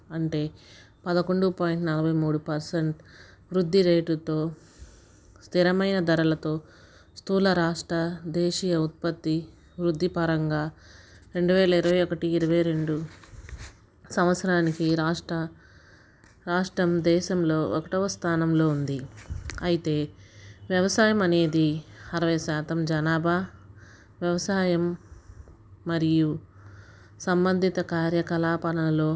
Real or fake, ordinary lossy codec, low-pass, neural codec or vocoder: real; none; none; none